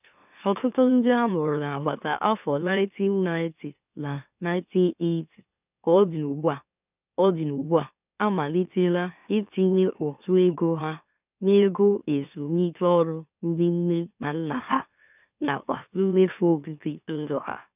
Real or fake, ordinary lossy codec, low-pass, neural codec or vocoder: fake; none; 3.6 kHz; autoencoder, 44.1 kHz, a latent of 192 numbers a frame, MeloTTS